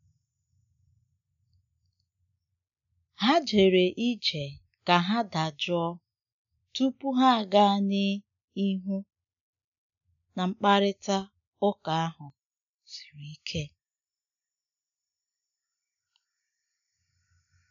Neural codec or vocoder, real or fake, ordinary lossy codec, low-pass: none; real; none; 7.2 kHz